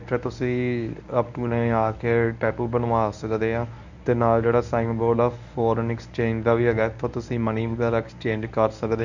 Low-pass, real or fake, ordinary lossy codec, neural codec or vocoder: 7.2 kHz; fake; none; codec, 24 kHz, 0.9 kbps, WavTokenizer, medium speech release version 1